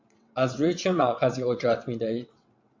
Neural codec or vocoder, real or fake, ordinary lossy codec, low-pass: vocoder, 22.05 kHz, 80 mel bands, WaveNeXt; fake; MP3, 48 kbps; 7.2 kHz